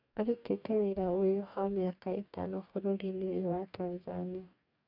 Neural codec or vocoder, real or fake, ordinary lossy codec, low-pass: codec, 44.1 kHz, 2.6 kbps, DAC; fake; none; 5.4 kHz